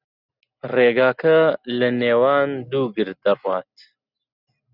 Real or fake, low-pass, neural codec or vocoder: real; 5.4 kHz; none